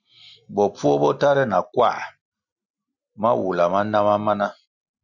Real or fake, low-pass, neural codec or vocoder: real; 7.2 kHz; none